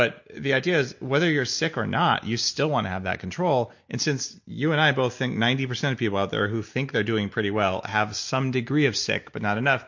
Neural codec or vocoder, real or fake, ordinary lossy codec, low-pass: none; real; MP3, 48 kbps; 7.2 kHz